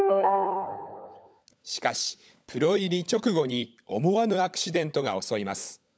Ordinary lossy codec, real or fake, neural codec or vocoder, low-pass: none; fake; codec, 16 kHz, 16 kbps, FunCodec, trained on LibriTTS, 50 frames a second; none